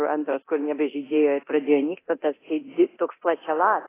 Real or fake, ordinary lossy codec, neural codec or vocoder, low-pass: fake; AAC, 16 kbps; codec, 24 kHz, 0.9 kbps, DualCodec; 3.6 kHz